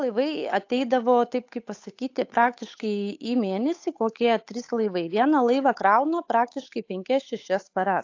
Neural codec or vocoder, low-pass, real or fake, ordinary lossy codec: codec, 16 kHz, 8 kbps, FunCodec, trained on Chinese and English, 25 frames a second; 7.2 kHz; fake; AAC, 48 kbps